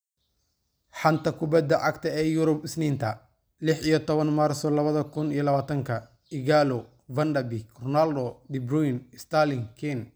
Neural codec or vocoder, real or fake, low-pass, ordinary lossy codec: none; real; none; none